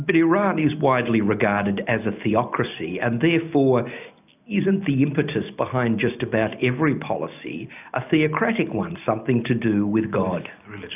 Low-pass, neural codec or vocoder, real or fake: 3.6 kHz; none; real